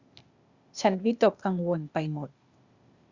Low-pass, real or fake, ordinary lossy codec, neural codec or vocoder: 7.2 kHz; fake; Opus, 64 kbps; codec, 16 kHz, 0.8 kbps, ZipCodec